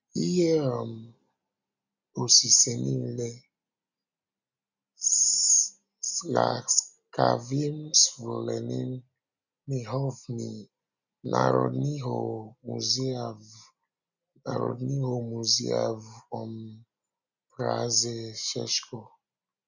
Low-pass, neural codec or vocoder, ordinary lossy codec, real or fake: 7.2 kHz; none; none; real